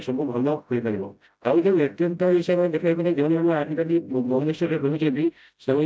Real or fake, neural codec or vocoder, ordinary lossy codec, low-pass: fake; codec, 16 kHz, 0.5 kbps, FreqCodec, smaller model; none; none